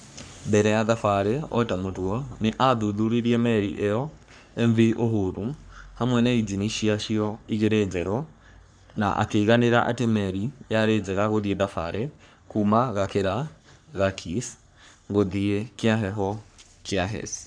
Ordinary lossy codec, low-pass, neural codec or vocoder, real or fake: none; 9.9 kHz; codec, 44.1 kHz, 3.4 kbps, Pupu-Codec; fake